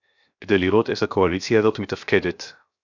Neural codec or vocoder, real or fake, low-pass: codec, 16 kHz, 0.7 kbps, FocalCodec; fake; 7.2 kHz